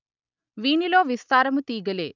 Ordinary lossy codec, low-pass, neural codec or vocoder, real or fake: none; 7.2 kHz; none; real